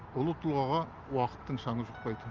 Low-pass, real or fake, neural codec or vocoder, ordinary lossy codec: 7.2 kHz; real; none; Opus, 32 kbps